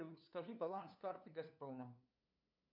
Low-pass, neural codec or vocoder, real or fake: 5.4 kHz; codec, 16 kHz, 2 kbps, FunCodec, trained on LibriTTS, 25 frames a second; fake